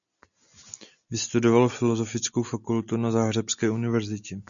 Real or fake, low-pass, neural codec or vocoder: real; 7.2 kHz; none